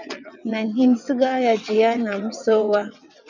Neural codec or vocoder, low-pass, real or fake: vocoder, 22.05 kHz, 80 mel bands, WaveNeXt; 7.2 kHz; fake